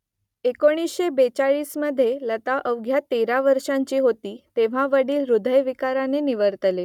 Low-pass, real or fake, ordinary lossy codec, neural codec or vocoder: 19.8 kHz; real; none; none